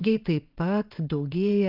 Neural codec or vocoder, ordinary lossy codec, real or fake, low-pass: vocoder, 22.05 kHz, 80 mel bands, WaveNeXt; Opus, 24 kbps; fake; 5.4 kHz